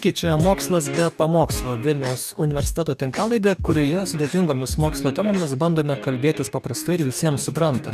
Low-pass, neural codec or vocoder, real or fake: 14.4 kHz; codec, 44.1 kHz, 2.6 kbps, DAC; fake